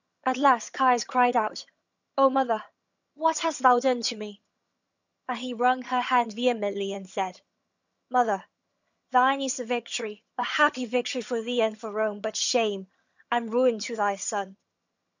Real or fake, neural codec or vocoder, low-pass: fake; vocoder, 22.05 kHz, 80 mel bands, HiFi-GAN; 7.2 kHz